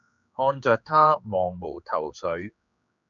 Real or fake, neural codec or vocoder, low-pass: fake; codec, 16 kHz, 4 kbps, X-Codec, HuBERT features, trained on general audio; 7.2 kHz